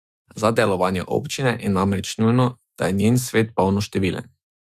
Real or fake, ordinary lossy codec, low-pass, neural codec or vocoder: fake; Opus, 64 kbps; 14.4 kHz; autoencoder, 48 kHz, 128 numbers a frame, DAC-VAE, trained on Japanese speech